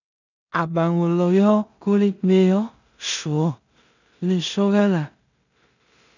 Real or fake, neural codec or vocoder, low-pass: fake; codec, 16 kHz in and 24 kHz out, 0.4 kbps, LongCat-Audio-Codec, two codebook decoder; 7.2 kHz